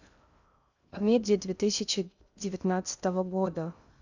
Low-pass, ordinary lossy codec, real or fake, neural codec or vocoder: 7.2 kHz; none; fake; codec, 16 kHz in and 24 kHz out, 0.6 kbps, FocalCodec, streaming, 2048 codes